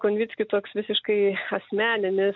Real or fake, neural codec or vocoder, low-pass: real; none; 7.2 kHz